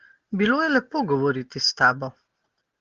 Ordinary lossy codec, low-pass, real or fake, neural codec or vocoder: Opus, 16 kbps; 7.2 kHz; real; none